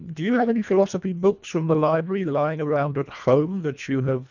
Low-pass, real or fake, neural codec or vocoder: 7.2 kHz; fake; codec, 24 kHz, 1.5 kbps, HILCodec